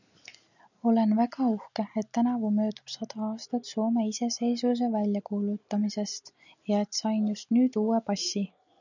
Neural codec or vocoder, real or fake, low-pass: none; real; 7.2 kHz